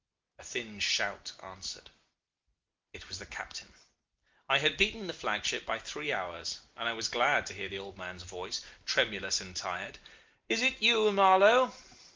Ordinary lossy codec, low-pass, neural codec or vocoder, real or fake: Opus, 32 kbps; 7.2 kHz; none; real